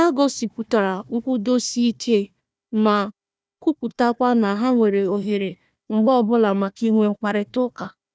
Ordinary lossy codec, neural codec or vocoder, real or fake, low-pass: none; codec, 16 kHz, 1 kbps, FunCodec, trained on Chinese and English, 50 frames a second; fake; none